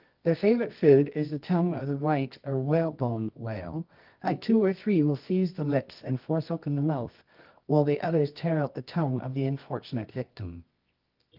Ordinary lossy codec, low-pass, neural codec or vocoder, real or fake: Opus, 32 kbps; 5.4 kHz; codec, 24 kHz, 0.9 kbps, WavTokenizer, medium music audio release; fake